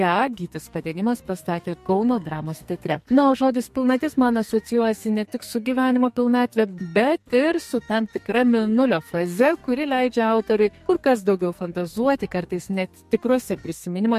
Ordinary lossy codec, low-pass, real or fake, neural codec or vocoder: MP3, 64 kbps; 14.4 kHz; fake; codec, 44.1 kHz, 2.6 kbps, SNAC